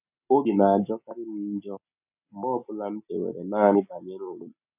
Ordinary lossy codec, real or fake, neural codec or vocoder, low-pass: none; real; none; 3.6 kHz